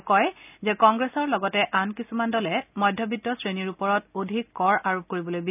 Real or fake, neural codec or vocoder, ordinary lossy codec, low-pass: real; none; none; 3.6 kHz